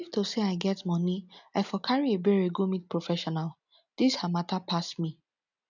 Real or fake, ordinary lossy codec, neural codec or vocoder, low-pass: real; none; none; 7.2 kHz